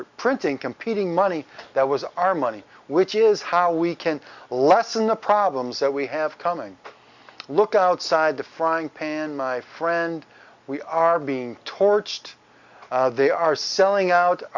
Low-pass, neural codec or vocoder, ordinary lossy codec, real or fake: 7.2 kHz; none; Opus, 64 kbps; real